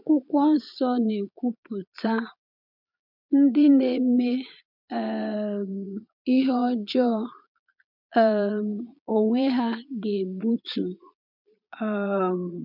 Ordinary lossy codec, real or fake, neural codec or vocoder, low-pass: MP3, 48 kbps; fake; vocoder, 22.05 kHz, 80 mel bands, Vocos; 5.4 kHz